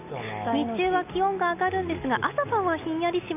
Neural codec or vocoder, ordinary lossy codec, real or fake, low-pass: none; none; real; 3.6 kHz